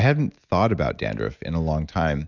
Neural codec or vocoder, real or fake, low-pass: none; real; 7.2 kHz